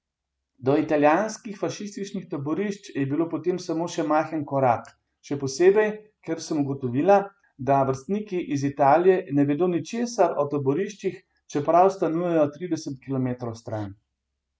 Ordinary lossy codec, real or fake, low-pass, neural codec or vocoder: none; real; none; none